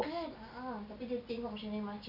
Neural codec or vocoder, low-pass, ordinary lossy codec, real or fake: none; 5.4 kHz; none; real